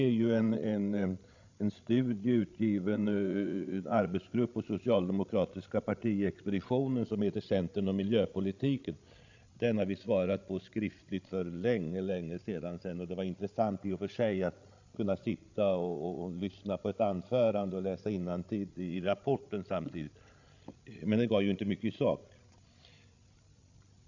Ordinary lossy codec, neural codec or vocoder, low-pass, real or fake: AAC, 48 kbps; codec, 16 kHz, 16 kbps, FreqCodec, larger model; 7.2 kHz; fake